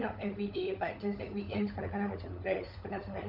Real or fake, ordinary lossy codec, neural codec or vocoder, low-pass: fake; none; codec, 16 kHz, 16 kbps, FunCodec, trained on LibriTTS, 50 frames a second; 5.4 kHz